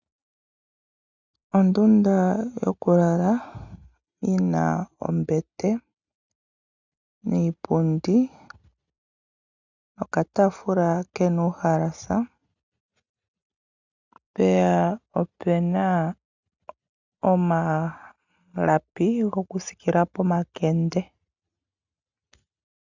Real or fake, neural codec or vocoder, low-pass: real; none; 7.2 kHz